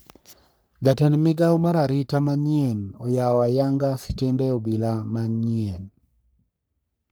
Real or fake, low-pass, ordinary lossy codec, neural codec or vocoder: fake; none; none; codec, 44.1 kHz, 3.4 kbps, Pupu-Codec